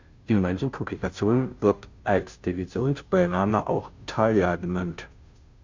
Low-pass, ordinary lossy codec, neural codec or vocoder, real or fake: 7.2 kHz; MP3, 64 kbps; codec, 16 kHz, 0.5 kbps, FunCodec, trained on Chinese and English, 25 frames a second; fake